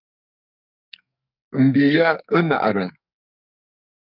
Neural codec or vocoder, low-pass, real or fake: codec, 44.1 kHz, 2.6 kbps, SNAC; 5.4 kHz; fake